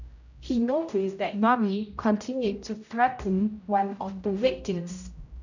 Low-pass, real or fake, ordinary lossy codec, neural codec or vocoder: 7.2 kHz; fake; none; codec, 16 kHz, 0.5 kbps, X-Codec, HuBERT features, trained on general audio